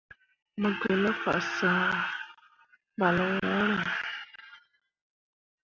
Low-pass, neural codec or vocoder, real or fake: 7.2 kHz; none; real